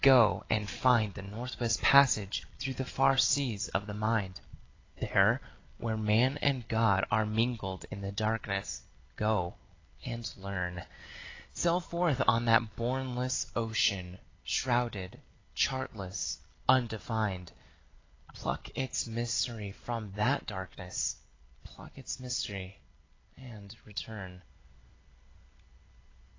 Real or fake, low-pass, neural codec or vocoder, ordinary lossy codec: real; 7.2 kHz; none; AAC, 32 kbps